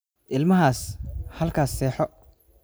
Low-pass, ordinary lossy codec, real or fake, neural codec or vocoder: none; none; real; none